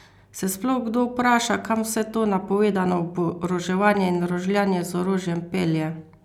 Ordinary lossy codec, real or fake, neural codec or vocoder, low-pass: none; real; none; 19.8 kHz